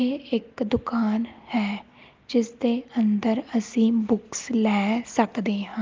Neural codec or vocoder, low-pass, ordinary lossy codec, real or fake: none; 7.2 kHz; Opus, 32 kbps; real